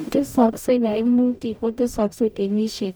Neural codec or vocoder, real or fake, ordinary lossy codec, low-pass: codec, 44.1 kHz, 0.9 kbps, DAC; fake; none; none